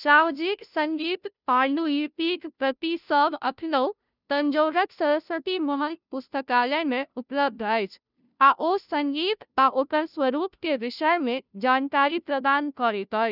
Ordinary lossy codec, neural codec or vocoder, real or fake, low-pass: none; codec, 16 kHz, 0.5 kbps, FunCodec, trained on Chinese and English, 25 frames a second; fake; 5.4 kHz